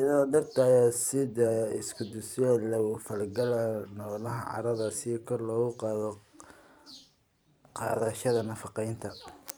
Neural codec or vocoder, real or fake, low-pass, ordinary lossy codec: vocoder, 44.1 kHz, 128 mel bands every 512 samples, BigVGAN v2; fake; none; none